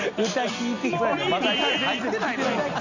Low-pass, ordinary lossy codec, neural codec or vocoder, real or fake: 7.2 kHz; none; none; real